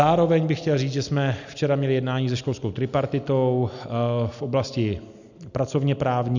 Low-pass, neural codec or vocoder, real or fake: 7.2 kHz; none; real